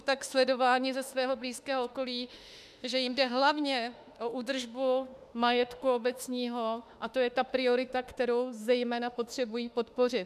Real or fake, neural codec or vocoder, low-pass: fake; autoencoder, 48 kHz, 32 numbers a frame, DAC-VAE, trained on Japanese speech; 14.4 kHz